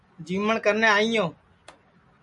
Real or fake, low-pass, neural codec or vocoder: real; 10.8 kHz; none